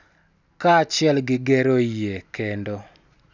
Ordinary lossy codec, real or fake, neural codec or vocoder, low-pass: none; real; none; 7.2 kHz